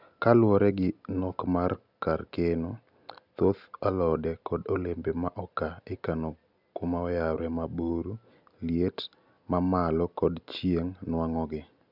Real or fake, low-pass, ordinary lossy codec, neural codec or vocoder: real; 5.4 kHz; none; none